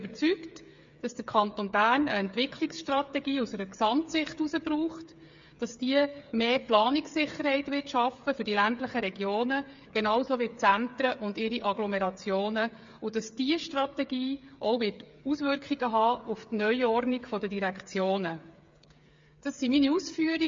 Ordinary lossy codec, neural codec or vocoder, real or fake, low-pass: MP3, 48 kbps; codec, 16 kHz, 8 kbps, FreqCodec, smaller model; fake; 7.2 kHz